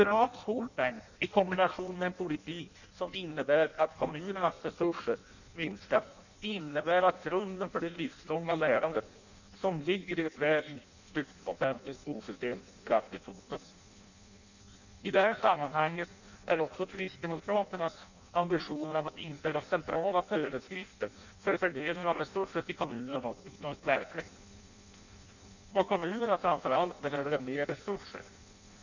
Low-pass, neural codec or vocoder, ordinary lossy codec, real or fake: 7.2 kHz; codec, 16 kHz in and 24 kHz out, 0.6 kbps, FireRedTTS-2 codec; none; fake